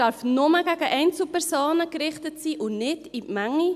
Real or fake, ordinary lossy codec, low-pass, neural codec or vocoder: real; none; 14.4 kHz; none